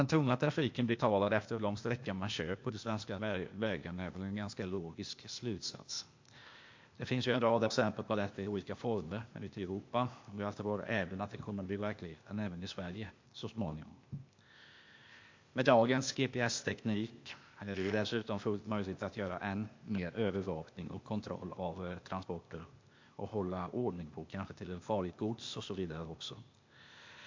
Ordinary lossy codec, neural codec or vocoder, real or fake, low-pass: MP3, 48 kbps; codec, 16 kHz, 0.8 kbps, ZipCodec; fake; 7.2 kHz